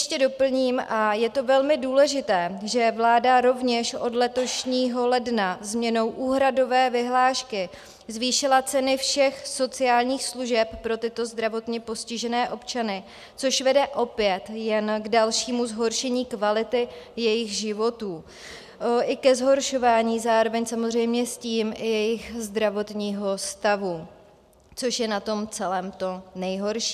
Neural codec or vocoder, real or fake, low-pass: none; real; 14.4 kHz